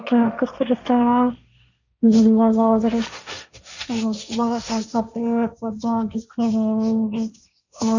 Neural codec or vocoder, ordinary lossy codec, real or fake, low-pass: codec, 16 kHz, 1.1 kbps, Voila-Tokenizer; none; fake; 7.2 kHz